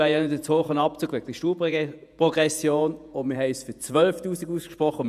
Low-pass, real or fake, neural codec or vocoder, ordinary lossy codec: 14.4 kHz; fake; vocoder, 48 kHz, 128 mel bands, Vocos; none